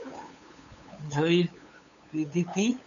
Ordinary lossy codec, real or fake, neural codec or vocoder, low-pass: MP3, 96 kbps; fake; codec, 16 kHz, 8 kbps, FunCodec, trained on LibriTTS, 25 frames a second; 7.2 kHz